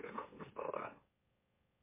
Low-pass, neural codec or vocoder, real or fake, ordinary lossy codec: 3.6 kHz; autoencoder, 44.1 kHz, a latent of 192 numbers a frame, MeloTTS; fake; MP3, 24 kbps